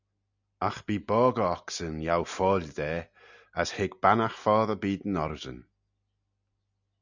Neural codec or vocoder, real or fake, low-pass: none; real; 7.2 kHz